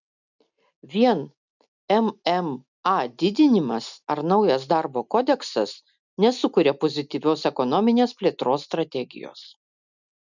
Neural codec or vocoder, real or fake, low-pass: none; real; 7.2 kHz